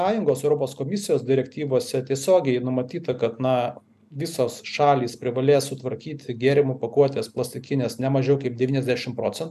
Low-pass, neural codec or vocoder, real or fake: 14.4 kHz; none; real